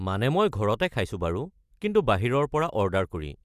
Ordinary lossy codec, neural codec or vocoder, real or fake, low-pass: Opus, 64 kbps; none; real; 14.4 kHz